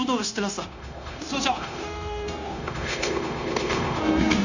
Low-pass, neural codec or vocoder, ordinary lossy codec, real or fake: 7.2 kHz; codec, 16 kHz, 0.9 kbps, LongCat-Audio-Codec; none; fake